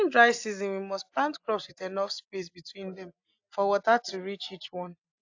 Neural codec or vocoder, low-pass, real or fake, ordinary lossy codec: none; 7.2 kHz; real; AAC, 48 kbps